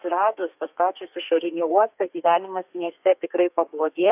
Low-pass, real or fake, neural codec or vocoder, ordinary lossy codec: 3.6 kHz; fake; codec, 32 kHz, 1.9 kbps, SNAC; AAC, 32 kbps